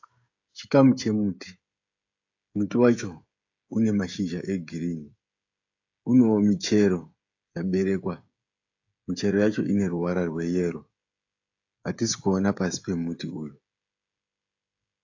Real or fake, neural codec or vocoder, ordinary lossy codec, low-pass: fake; codec, 16 kHz, 16 kbps, FreqCodec, smaller model; AAC, 48 kbps; 7.2 kHz